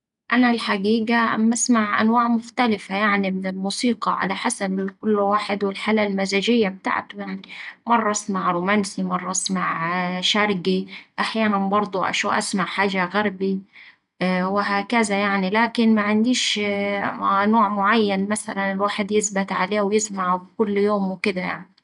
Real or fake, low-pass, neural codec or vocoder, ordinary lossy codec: real; 10.8 kHz; none; MP3, 96 kbps